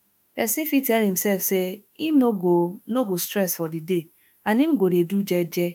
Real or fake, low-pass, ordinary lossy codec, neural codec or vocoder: fake; none; none; autoencoder, 48 kHz, 32 numbers a frame, DAC-VAE, trained on Japanese speech